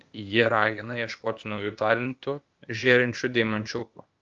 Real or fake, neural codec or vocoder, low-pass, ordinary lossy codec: fake; codec, 16 kHz, 0.8 kbps, ZipCodec; 7.2 kHz; Opus, 24 kbps